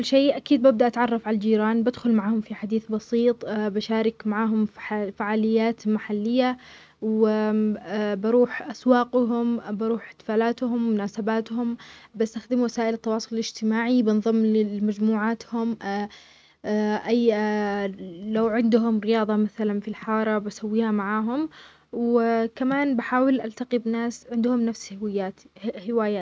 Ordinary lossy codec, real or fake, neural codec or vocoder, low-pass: none; real; none; none